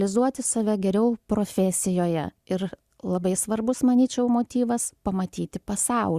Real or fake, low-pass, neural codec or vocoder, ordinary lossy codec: real; 14.4 kHz; none; Opus, 64 kbps